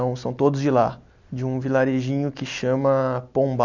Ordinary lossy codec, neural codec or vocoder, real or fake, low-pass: none; none; real; 7.2 kHz